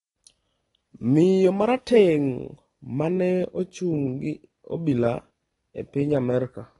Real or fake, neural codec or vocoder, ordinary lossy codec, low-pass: real; none; AAC, 32 kbps; 10.8 kHz